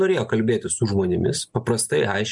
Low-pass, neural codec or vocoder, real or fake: 10.8 kHz; none; real